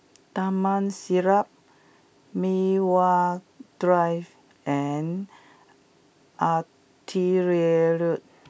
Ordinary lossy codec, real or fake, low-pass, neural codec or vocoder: none; real; none; none